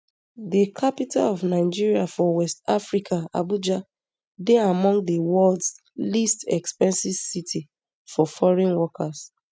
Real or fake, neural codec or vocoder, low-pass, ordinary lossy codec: real; none; none; none